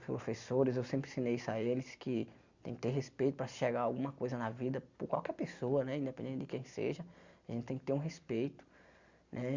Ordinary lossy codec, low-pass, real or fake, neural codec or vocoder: Opus, 64 kbps; 7.2 kHz; real; none